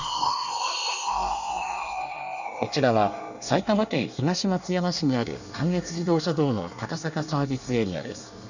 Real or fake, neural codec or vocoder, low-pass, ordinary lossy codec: fake; codec, 24 kHz, 1 kbps, SNAC; 7.2 kHz; none